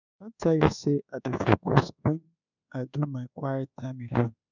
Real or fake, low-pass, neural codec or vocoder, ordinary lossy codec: fake; 7.2 kHz; codec, 24 kHz, 1.2 kbps, DualCodec; none